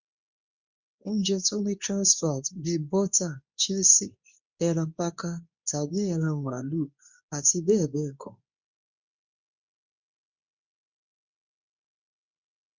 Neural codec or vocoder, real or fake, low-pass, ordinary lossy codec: codec, 24 kHz, 0.9 kbps, WavTokenizer, medium speech release version 2; fake; 7.2 kHz; Opus, 64 kbps